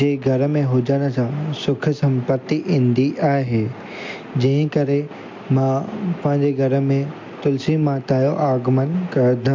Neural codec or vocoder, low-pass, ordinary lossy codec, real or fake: none; 7.2 kHz; MP3, 48 kbps; real